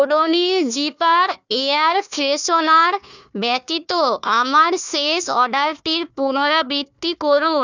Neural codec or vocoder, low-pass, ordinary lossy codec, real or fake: codec, 16 kHz, 1 kbps, FunCodec, trained on Chinese and English, 50 frames a second; 7.2 kHz; none; fake